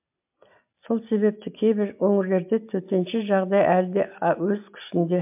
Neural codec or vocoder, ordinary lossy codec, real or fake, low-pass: none; MP3, 32 kbps; real; 3.6 kHz